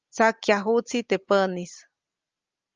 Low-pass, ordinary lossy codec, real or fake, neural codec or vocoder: 7.2 kHz; Opus, 24 kbps; real; none